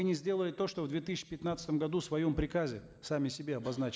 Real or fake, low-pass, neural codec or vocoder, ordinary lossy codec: real; none; none; none